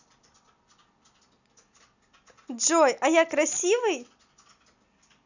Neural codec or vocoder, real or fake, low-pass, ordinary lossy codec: none; real; 7.2 kHz; none